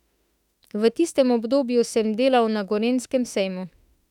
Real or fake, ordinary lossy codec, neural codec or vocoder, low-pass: fake; none; autoencoder, 48 kHz, 32 numbers a frame, DAC-VAE, trained on Japanese speech; 19.8 kHz